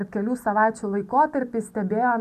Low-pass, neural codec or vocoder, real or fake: 14.4 kHz; none; real